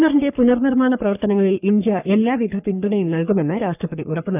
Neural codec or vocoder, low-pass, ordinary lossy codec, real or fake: codec, 44.1 kHz, 3.4 kbps, Pupu-Codec; 3.6 kHz; none; fake